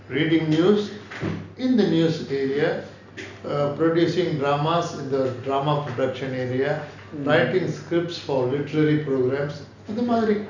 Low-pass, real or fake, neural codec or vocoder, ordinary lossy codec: 7.2 kHz; real; none; none